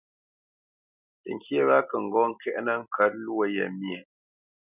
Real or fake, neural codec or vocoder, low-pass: real; none; 3.6 kHz